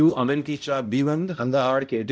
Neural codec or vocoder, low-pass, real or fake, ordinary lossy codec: codec, 16 kHz, 0.5 kbps, X-Codec, HuBERT features, trained on balanced general audio; none; fake; none